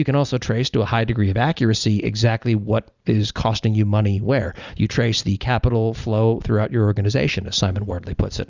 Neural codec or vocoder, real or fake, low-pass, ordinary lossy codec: none; real; 7.2 kHz; Opus, 64 kbps